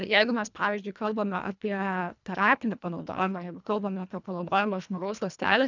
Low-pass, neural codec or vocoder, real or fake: 7.2 kHz; codec, 24 kHz, 1.5 kbps, HILCodec; fake